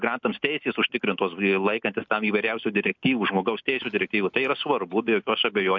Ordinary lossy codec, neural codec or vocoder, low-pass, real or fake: MP3, 64 kbps; none; 7.2 kHz; real